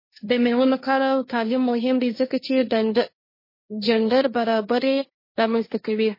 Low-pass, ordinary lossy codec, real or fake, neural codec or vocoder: 5.4 kHz; MP3, 24 kbps; fake; codec, 16 kHz, 1.1 kbps, Voila-Tokenizer